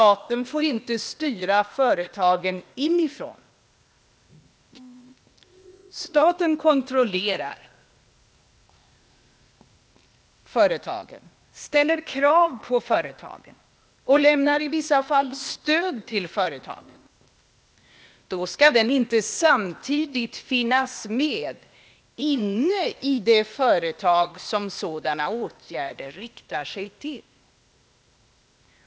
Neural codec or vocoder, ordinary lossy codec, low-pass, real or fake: codec, 16 kHz, 0.8 kbps, ZipCodec; none; none; fake